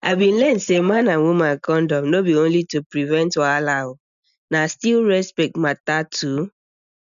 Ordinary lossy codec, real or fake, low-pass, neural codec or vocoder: none; real; 7.2 kHz; none